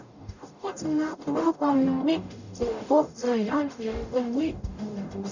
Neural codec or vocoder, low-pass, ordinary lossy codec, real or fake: codec, 44.1 kHz, 0.9 kbps, DAC; 7.2 kHz; none; fake